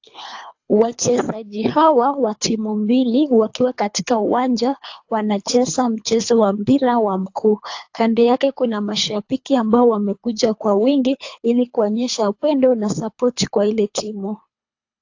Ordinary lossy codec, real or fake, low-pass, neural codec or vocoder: AAC, 48 kbps; fake; 7.2 kHz; codec, 24 kHz, 3 kbps, HILCodec